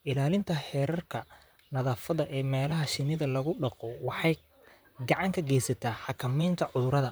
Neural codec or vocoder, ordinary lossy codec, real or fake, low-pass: vocoder, 44.1 kHz, 128 mel bands, Pupu-Vocoder; none; fake; none